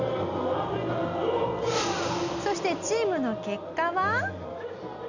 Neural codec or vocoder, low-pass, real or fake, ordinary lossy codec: none; 7.2 kHz; real; none